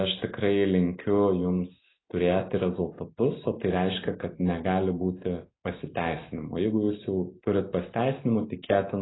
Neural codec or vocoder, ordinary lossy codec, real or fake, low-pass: none; AAC, 16 kbps; real; 7.2 kHz